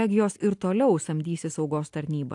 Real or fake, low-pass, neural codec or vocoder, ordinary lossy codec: real; 10.8 kHz; none; AAC, 64 kbps